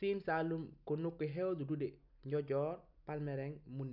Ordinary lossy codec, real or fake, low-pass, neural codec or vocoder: none; real; 5.4 kHz; none